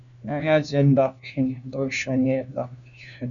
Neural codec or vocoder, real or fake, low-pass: codec, 16 kHz, 1 kbps, FunCodec, trained on LibriTTS, 50 frames a second; fake; 7.2 kHz